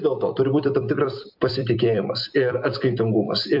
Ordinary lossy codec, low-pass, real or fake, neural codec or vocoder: AAC, 48 kbps; 5.4 kHz; fake; vocoder, 44.1 kHz, 128 mel bands every 256 samples, BigVGAN v2